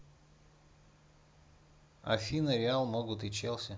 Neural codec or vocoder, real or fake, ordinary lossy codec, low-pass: none; real; none; none